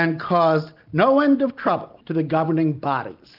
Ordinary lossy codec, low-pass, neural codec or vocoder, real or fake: Opus, 32 kbps; 5.4 kHz; none; real